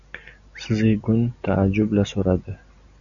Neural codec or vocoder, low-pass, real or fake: none; 7.2 kHz; real